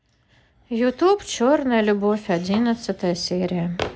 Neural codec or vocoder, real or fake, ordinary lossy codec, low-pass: none; real; none; none